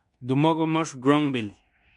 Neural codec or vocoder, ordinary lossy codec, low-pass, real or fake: codec, 16 kHz in and 24 kHz out, 0.9 kbps, LongCat-Audio-Codec, fine tuned four codebook decoder; MP3, 64 kbps; 10.8 kHz; fake